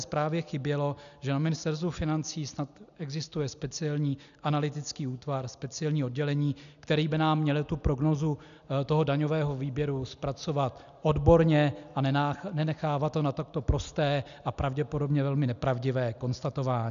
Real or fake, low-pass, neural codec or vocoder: real; 7.2 kHz; none